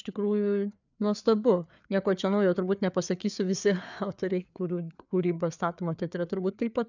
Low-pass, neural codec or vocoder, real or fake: 7.2 kHz; codec, 16 kHz, 4 kbps, FreqCodec, larger model; fake